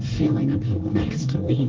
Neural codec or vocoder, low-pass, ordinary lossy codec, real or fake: codec, 24 kHz, 1 kbps, SNAC; 7.2 kHz; Opus, 16 kbps; fake